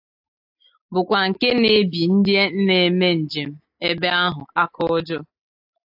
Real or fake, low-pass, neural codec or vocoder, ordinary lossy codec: real; 5.4 kHz; none; AAC, 48 kbps